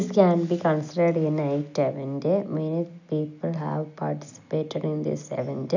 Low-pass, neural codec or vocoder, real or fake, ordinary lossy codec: 7.2 kHz; none; real; none